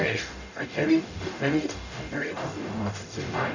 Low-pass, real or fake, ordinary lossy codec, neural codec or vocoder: 7.2 kHz; fake; none; codec, 44.1 kHz, 0.9 kbps, DAC